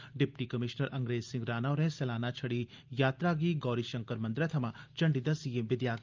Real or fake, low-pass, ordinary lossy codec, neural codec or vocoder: real; 7.2 kHz; Opus, 24 kbps; none